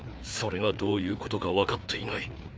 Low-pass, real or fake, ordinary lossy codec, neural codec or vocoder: none; fake; none; codec, 16 kHz, 4 kbps, FreqCodec, larger model